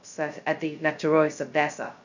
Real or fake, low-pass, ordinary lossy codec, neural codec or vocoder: fake; 7.2 kHz; none; codec, 16 kHz, 0.2 kbps, FocalCodec